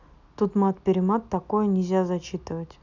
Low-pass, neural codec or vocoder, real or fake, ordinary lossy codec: 7.2 kHz; none; real; none